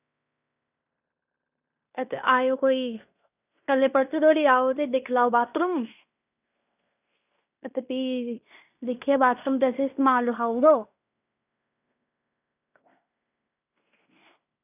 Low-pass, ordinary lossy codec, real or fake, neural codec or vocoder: 3.6 kHz; none; fake; codec, 16 kHz in and 24 kHz out, 0.9 kbps, LongCat-Audio-Codec, fine tuned four codebook decoder